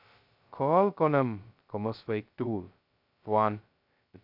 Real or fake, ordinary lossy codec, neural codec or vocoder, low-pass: fake; none; codec, 16 kHz, 0.2 kbps, FocalCodec; 5.4 kHz